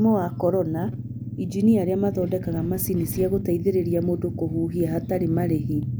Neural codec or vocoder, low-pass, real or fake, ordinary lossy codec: none; none; real; none